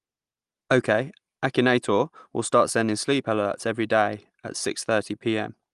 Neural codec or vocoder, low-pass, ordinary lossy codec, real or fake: none; 10.8 kHz; Opus, 32 kbps; real